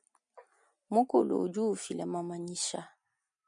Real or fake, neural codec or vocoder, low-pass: real; none; 9.9 kHz